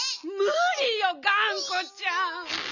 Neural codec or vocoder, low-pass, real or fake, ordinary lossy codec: none; 7.2 kHz; real; none